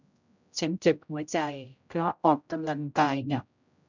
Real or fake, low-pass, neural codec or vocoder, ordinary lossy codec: fake; 7.2 kHz; codec, 16 kHz, 0.5 kbps, X-Codec, HuBERT features, trained on general audio; none